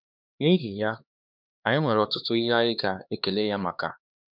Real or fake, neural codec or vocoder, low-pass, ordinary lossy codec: fake; codec, 16 kHz, 2 kbps, X-Codec, HuBERT features, trained on LibriSpeech; 5.4 kHz; none